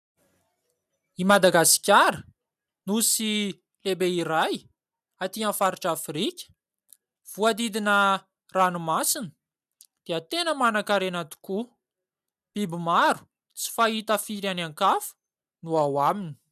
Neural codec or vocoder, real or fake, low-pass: none; real; 14.4 kHz